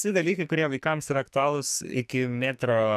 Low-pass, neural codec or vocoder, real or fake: 14.4 kHz; codec, 44.1 kHz, 2.6 kbps, SNAC; fake